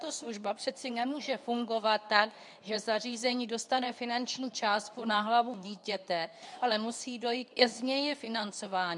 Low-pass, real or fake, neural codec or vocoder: 10.8 kHz; fake; codec, 24 kHz, 0.9 kbps, WavTokenizer, medium speech release version 2